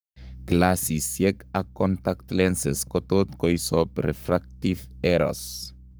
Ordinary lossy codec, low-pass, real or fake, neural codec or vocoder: none; none; fake; codec, 44.1 kHz, 7.8 kbps, Pupu-Codec